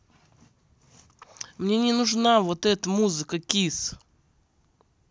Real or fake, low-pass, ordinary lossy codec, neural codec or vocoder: real; none; none; none